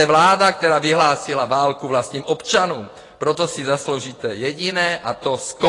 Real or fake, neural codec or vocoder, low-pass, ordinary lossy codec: fake; vocoder, 24 kHz, 100 mel bands, Vocos; 10.8 kHz; AAC, 32 kbps